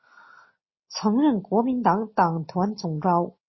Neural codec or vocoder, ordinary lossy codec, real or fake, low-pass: none; MP3, 24 kbps; real; 7.2 kHz